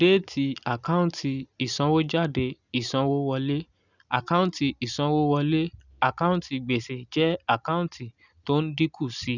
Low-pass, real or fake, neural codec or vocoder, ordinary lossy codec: 7.2 kHz; real; none; none